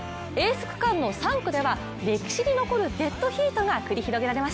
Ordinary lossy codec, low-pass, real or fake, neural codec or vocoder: none; none; real; none